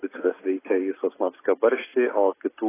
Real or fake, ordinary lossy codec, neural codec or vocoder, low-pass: real; AAC, 16 kbps; none; 3.6 kHz